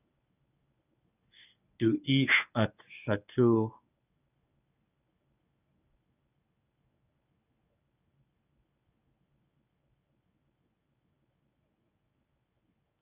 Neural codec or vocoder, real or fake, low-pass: codec, 24 kHz, 0.9 kbps, WavTokenizer, medium speech release version 1; fake; 3.6 kHz